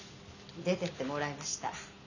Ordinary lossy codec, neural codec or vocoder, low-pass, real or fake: none; none; 7.2 kHz; real